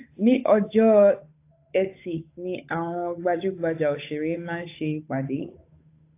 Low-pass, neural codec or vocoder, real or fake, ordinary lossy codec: 3.6 kHz; codec, 16 kHz, 8 kbps, FunCodec, trained on Chinese and English, 25 frames a second; fake; AAC, 24 kbps